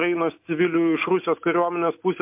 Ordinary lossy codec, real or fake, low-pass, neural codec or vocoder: MP3, 32 kbps; real; 3.6 kHz; none